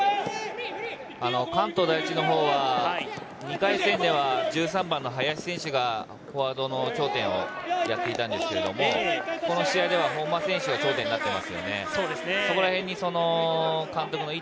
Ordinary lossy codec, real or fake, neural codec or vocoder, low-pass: none; real; none; none